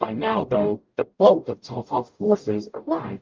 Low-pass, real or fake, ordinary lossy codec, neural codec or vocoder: 7.2 kHz; fake; Opus, 24 kbps; codec, 44.1 kHz, 0.9 kbps, DAC